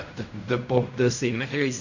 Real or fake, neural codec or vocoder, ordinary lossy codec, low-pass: fake; codec, 16 kHz in and 24 kHz out, 0.4 kbps, LongCat-Audio-Codec, fine tuned four codebook decoder; none; 7.2 kHz